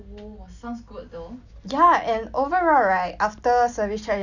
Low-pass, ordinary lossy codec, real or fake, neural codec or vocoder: 7.2 kHz; none; real; none